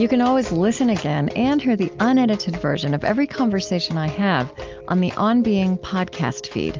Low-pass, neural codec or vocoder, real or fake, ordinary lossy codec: 7.2 kHz; none; real; Opus, 32 kbps